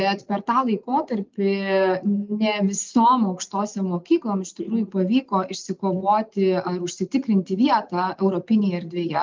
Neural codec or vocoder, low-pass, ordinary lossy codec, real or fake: none; 7.2 kHz; Opus, 32 kbps; real